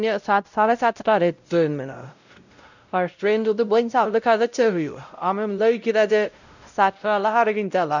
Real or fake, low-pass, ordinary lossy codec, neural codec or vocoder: fake; 7.2 kHz; none; codec, 16 kHz, 0.5 kbps, X-Codec, WavLM features, trained on Multilingual LibriSpeech